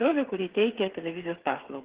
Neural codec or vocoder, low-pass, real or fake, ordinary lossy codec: codec, 16 kHz, 8 kbps, FreqCodec, smaller model; 3.6 kHz; fake; Opus, 16 kbps